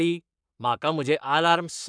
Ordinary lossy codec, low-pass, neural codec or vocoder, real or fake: none; 9.9 kHz; codec, 44.1 kHz, 7.8 kbps, Pupu-Codec; fake